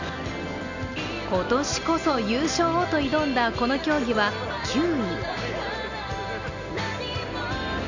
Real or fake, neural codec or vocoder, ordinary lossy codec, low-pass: real; none; none; 7.2 kHz